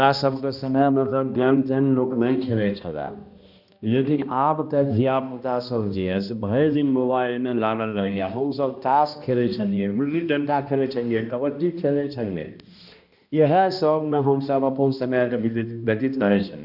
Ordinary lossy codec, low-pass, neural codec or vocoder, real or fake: none; 5.4 kHz; codec, 16 kHz, 1 kbps, X-Codec, HuBERT features, trained on balanced general audio; fake